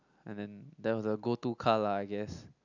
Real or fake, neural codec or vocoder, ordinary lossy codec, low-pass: real; none; none; 7.2 kHz